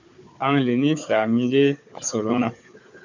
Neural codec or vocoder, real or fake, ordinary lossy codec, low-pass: codec, 16 kHz, 4 kbps, FunCodec, trained on Chinese and English, 50 frames a second; fake; MP3, 64 kbps; 7.2 kHz